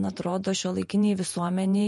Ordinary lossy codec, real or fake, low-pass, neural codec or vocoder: MP3, 48 kbps; fake; 14.4 kHz; vocoder, 48 kHz, 128 mel bands, Vocos